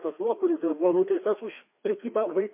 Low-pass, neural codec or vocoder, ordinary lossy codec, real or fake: 3.6 kHz; codec, 16 kHz, 2 kbps, FreqCodec, larger model; MP3, 24 kbps; fake